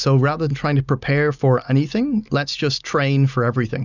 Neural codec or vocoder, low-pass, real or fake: none; 7.2 kHz; real